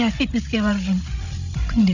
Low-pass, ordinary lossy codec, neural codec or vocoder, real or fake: 7.2 kHz; none; codec, 16 kHz, 16 kbps, FreqCodec, larger model; fake